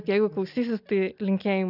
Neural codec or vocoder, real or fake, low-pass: none; real; 5.4 kHz